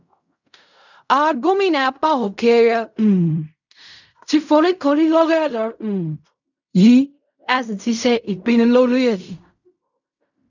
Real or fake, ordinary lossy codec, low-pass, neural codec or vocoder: fake; none; 7.2 kHz; codec, 16 kHz in and 24 kHz out, 0.4 kbps, LongCat-Audio-Codec, fine tuned four codebook decoder